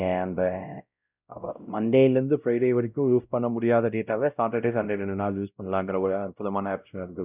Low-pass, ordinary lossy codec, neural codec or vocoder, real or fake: 3.6 kHz; none; codec, 16 kHz, 0.5 kbps, X-Codec, WavLM features, trained on Multilingual LibriSpeech; fake